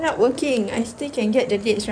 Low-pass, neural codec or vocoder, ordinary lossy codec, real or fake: 9.9 kHz; codec, 16 kHz in and 24 kHz out, 2.2 kbps, FireRedTTS-2 codec; none; fake